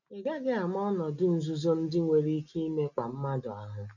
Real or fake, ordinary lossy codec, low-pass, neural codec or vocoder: real; none; 7.2 kHz; none